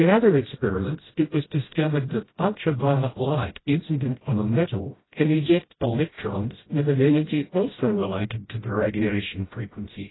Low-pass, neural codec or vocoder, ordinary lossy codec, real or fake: 7.2 kHz; codec, 16 kHz, 0.5 kbps, FreqCodec, smaller model; AAC, 16 kbps; fake